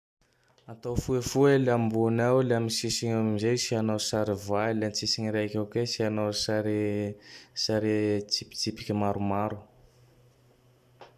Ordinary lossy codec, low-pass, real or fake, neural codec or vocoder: none; 14.4 kHz; real; none